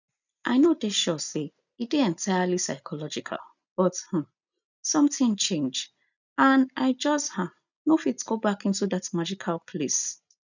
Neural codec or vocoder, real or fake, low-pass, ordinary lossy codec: none; real; 7.2 kHz; none